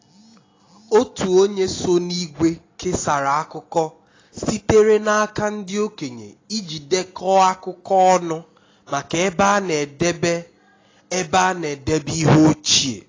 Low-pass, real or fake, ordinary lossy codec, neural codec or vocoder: 7.2 kHz; real; AAC, 32 kbps; none